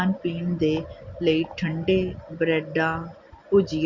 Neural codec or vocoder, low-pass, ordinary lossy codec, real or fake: none; 7.2 kHz; Opus, 64 kbps; real